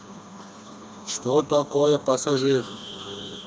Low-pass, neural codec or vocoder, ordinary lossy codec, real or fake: none; codec, 16 kHz, 2 kbps, FreqCodec, smaller model; none; fake